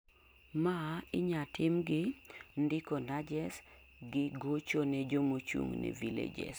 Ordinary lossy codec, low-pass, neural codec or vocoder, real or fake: none; none; none; real